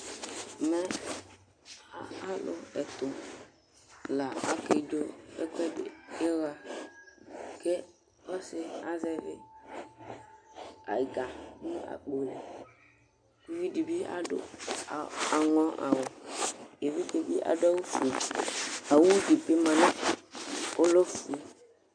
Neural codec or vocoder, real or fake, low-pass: none; real; 9.9 kHz